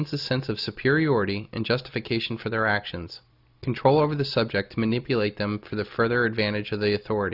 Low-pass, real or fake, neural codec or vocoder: 5.4 kHz; real; none